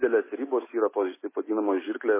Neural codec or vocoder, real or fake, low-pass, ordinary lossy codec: none; real; 3.6 kHz; MP3, 16 kbps